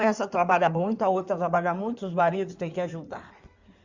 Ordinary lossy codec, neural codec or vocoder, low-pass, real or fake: Opus, 64 kbps; codec, 16 kHz in and 24 kHz out, 2.2 kbps, FireRedTTS-2 codec; 7.2 kHz; fake